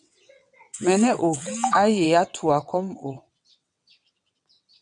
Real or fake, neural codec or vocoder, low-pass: fake; vocoder, 22.05 kHz, 80 mel bands, WaveNeXt; 9.9 kHz